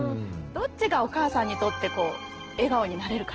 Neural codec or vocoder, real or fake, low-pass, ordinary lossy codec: none; real; 7.2 kHz; Opus, 16 kbps